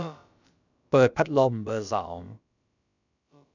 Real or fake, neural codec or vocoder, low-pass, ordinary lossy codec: fake; codec, 16 kHz, about 1 kbps, DyCAST, with the encoder's durations; 7.2 kHz; none